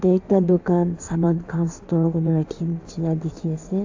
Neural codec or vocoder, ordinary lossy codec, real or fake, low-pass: codec, 16 kHz in and 24 kHz out, 1.1 kbps, FireRedTTS-2 codec; AAC, 48 kbps; fake; 7.2 kHz